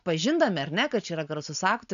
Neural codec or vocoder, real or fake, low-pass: none; real; 7.2 kHz